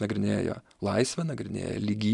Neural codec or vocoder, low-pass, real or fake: none; 10.8 kHz; real